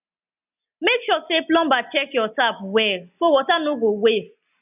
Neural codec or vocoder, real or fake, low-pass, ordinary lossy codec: none; real; 3.6 kHz; none